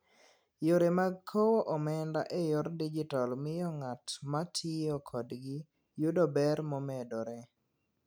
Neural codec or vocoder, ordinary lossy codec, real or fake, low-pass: none; none; real; none